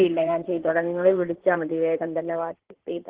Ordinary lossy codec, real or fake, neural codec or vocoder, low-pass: Opus, 16 kbps; fake; codec, 16 kHz in and 24 kHz out, 2.2 kbps, FireRedTTS-2 codec; 3.6 kHz